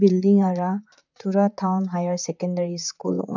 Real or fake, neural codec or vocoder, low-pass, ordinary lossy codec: fake; vocoder, 44.1 kHz, 128 mel bands, Pupu-Vocoder; 7.2 kHz; none